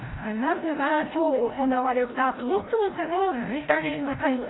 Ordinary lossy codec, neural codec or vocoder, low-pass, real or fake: AAC, 16 kbps; codec, 16 kHz, 0.5 kbps, FreqCodec, larger model; 7.2 kHz; fake